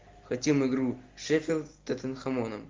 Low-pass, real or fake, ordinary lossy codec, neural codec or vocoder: 7.2 kHz; real; Opus, 32 kbps; none